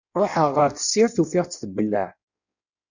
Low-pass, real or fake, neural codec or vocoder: 7.2 kHz; fake; codec, 16 kHz in and 24 kHz out, 1.1 kbps, FireRedTTS-2 codec